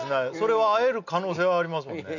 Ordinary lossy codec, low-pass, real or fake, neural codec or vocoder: none; 7.2 kHz; real; none